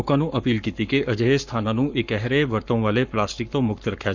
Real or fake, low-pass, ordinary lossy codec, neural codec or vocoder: fake; 7.2 kHz; none; codec, 44.1 kHz, 7.8 kbps, Pupu-Codec